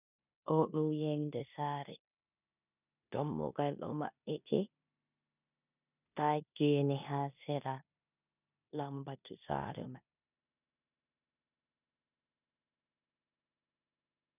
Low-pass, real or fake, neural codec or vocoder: 3.6 kHz; fake; codec, 16 kHz in and 24 kHz out, 0.9 kbps, LongCat-Audio-Codec, four codebook decoder